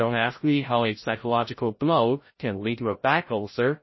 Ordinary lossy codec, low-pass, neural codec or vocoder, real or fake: MP3, 24 kbps; 7.2 kHz; codec, 16 kHz, 0.5 kbps, FreqCodec, larger model; fake